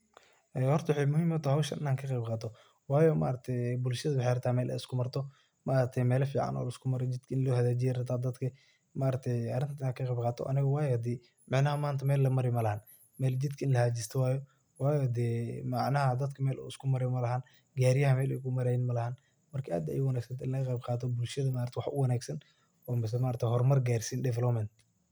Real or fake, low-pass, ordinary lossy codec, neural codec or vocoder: real; none; none; none